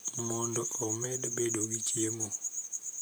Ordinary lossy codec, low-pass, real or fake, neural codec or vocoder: none; none; fake; vocoder, 44.1 kHz, 128 mel bands, Pupu-Vocoder